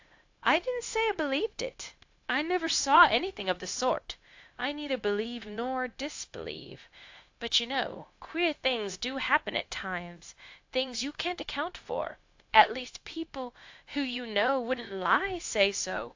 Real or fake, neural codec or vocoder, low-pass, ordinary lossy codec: fake; codec, 16 kHz, 0.9 kbps, LongCat-Audio-Codec; 7.2 kHz; AAC, 48 kbps